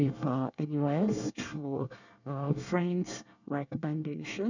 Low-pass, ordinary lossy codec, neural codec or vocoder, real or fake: 7.2 kHz; none; codec, 24 kHz, 1 kbps, SNAC; fake